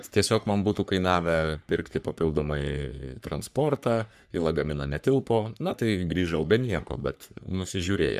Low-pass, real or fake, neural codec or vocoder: 14.4 kHz; fake; codec, 44.1 kHz, 3.4 kbps, Pupu-Codec